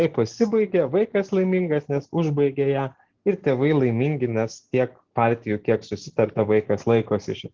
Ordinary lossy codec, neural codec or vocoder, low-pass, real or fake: Opus, 16 kbps; none; 7.2 kHz; real